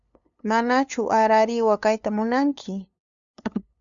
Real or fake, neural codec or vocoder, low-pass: fake; codec, 16 kHz, 2 kbps, FunCodec, trained on LibriTTS, 25 frames a second; 7.2 kHz